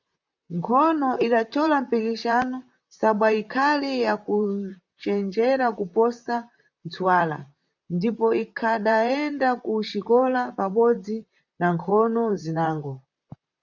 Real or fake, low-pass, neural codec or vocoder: fake; 7.2 kHz; vocoder, 44.1 kHz, 128 mel bands, Pupu-Vocoder